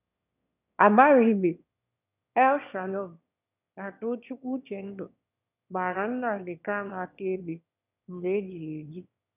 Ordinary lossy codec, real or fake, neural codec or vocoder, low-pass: none; fake; autoencoder, 22.05 kHz, a latent of 192 numbers a frame, VITS, trained on one speaker; 3.6 kHz